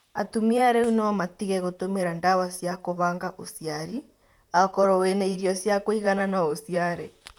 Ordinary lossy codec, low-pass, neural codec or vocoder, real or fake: none; 19.8 kHz; vocoder, 44.1 kHz, 128 mel bands, Pupu-Vocoder; fake